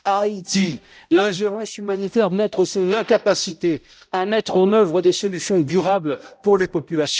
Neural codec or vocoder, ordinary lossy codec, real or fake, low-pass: codec, 16 kHz, 0.5 kbps, X-Codec, HuBERT features, trained on balanced general audio; none; fake; none